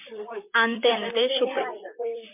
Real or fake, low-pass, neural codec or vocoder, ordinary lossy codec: fake; 3.6 kHz; vocoder, 44.1 kHz, 128 mel bands, Pupu-Vocoder; MP3, 32 kbps